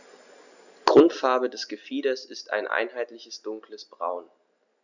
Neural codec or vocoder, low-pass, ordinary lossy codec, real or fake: none; 7.2 kHz; none; real